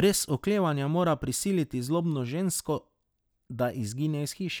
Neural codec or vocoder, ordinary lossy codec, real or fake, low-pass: none; none; real; none